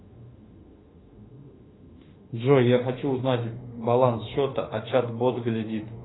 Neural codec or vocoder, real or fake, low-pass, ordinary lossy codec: autoencoder, 48 kHz, 32 numbers a frame, DAC-VAE, trained on Japanese speech; fake; 7.2 kHz; AAC, 16 kbps